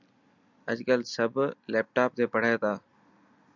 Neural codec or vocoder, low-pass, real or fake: none; 7.2 kHz; real